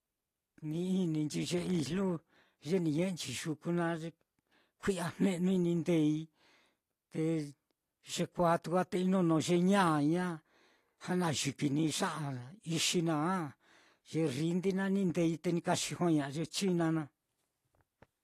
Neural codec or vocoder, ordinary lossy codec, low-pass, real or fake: none; AAC, 48 kbps; 14.4 kHz; real